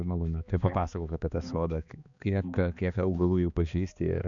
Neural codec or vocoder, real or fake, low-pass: codec, 16 kHz, 2 kbps, X-Codec, HuBERT features, trained on balanced general audio; fake; 7.2 kHz